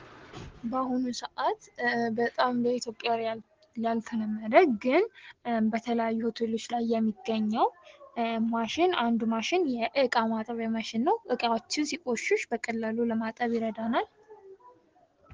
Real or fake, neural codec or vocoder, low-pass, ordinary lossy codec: real; none; 7.2 kHz; Opus, 16 kbps